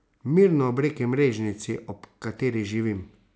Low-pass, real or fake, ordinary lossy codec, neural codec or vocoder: none; real; none; none